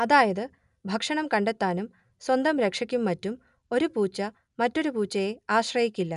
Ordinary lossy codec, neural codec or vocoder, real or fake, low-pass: none; none; real; 10.8 kHz